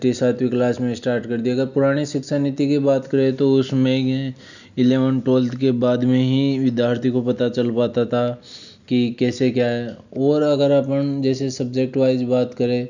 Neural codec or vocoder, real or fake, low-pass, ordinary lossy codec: none; real; 7.2 kHz; none